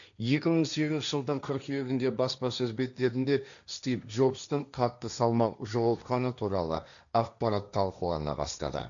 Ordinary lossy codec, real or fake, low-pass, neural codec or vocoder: none; fake; 7.2 kHz; codec, 16 kHz, 1.1 kbps, Voila-Tokenizer